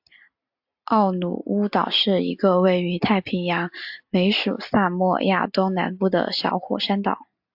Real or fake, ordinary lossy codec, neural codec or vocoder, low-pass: real; AAC, 48 kbps; none; 5.4 kHz